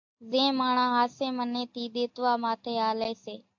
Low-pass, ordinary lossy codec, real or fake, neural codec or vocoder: 7.2 kHz; AAC, 48 kbps; real; none